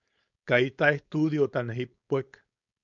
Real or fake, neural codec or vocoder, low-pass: fake; codec, 16 kHz, 4.8 kbps, FACodec; 7.2 kHz